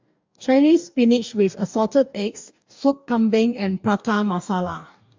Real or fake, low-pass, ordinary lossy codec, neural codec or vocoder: fake; 7.2 kHz; MP3, 64 kbps; codec, 44.1 kHz, 2.6 kbps, DAC